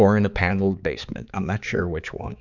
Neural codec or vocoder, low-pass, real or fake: codec, 16 kHz, 4 kbps, X-Codec, HuBERT features, trained on balanced general audio; 7.2 kHz; fake